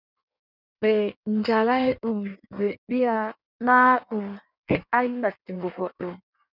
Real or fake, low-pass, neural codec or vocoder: fake; 5.4 kHz; codec, 16 kHz in and 24 kHz out, 1.1 kbps, FireRedTTS-2 codec